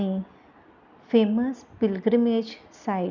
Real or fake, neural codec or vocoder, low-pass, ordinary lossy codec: real; none; 7.2 kHz; none